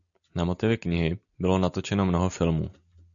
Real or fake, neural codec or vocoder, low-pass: real; none; 7.2 kHz